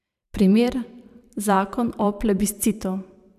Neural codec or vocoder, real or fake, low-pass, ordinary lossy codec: vocoder, 44.1 kHz, 128 mel bands every 512 samples, BigVGAN v2; fake; 14.4 kHz; none